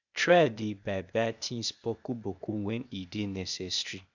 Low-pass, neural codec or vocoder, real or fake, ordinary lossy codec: 7.2 kHz; codec, 16 kHz, 0.8 kbps, ZipCodec; fake; none